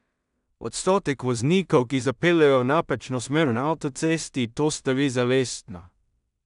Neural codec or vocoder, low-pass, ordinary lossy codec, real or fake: codec, 16 kHz in and 24 kHz out, 0.4 kbps, LongCat-Audio-Codec, two codebook decoder; 10.8 kHz; none; fake